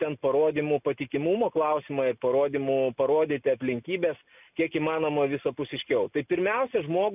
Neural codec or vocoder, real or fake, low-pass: none; real; 3.6 kHz